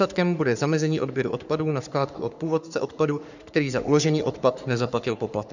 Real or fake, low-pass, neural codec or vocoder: fake; 7.2 kHz; codec, 44.1 kHz, 3.4 kbps, Pupu-Codec